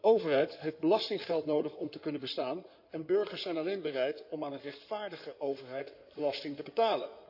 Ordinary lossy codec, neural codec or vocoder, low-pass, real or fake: none; codec, 16 kHz in and 24 kHz out, 2.2 kbps, FireRedTTS-2 codec; 5.4 kHz; fake